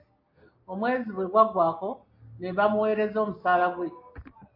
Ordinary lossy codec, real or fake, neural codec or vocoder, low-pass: MP3, 32 kbps; real; none; 5.4 kHz